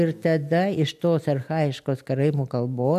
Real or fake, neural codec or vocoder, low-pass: real; none; 14.4 kHz